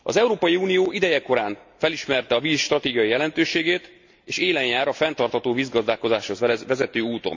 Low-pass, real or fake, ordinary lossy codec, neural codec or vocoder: 7.2 kHz; real; MP3, 48 kbps; none